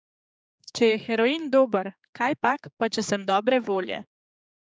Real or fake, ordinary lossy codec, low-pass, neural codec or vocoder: fake; none; none; codec, 16 kHz, 4 kbps, X-Codec, HuBERT features, trained on general audio